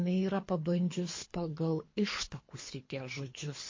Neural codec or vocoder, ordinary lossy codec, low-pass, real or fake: codec, 24 kHz, 3 kbps, HILCodec; MP3, 32 kbps; 7.2 kHz; fake